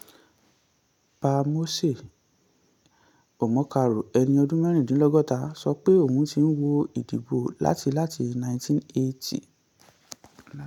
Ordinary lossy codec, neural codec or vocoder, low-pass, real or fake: none; none; 19.8 kHz; real